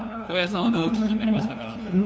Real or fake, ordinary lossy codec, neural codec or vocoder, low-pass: fake; none; codec, 16 kHz, 2 kbps, FunCodec, trained on LibriTTS, 25 frames a second; none